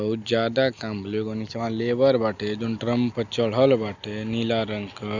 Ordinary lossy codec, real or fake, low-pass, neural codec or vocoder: Opus, 64 kbps; real; 7.2 kHz; none